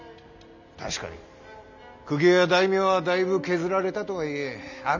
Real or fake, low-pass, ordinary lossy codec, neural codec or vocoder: real; 7.2 kHz; none; none